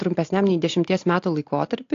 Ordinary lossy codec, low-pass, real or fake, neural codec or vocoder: AAC, 48 kbps; 7.2 kHz; real; none